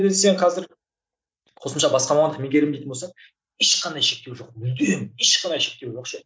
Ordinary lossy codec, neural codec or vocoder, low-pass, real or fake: none; none; none; real